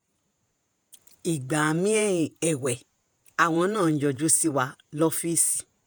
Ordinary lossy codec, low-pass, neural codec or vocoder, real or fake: none; none; vocoder, 48 kHz, 128 mel bands, Vocos; fake